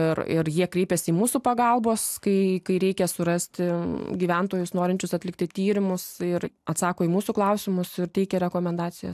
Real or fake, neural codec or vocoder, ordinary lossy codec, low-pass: real; none; AAC, 96 kbps; 14.4 kHz